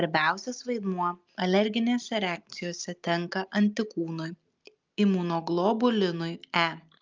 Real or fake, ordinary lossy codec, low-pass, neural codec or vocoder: real; Opus, 24 kbps; 7.2 kHz; none